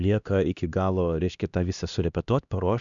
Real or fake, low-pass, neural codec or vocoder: fake; 7.2 kHz; codec, 16 kHz, 1 kbps, X-Codec, HuBERT features, trained on LibriSpeech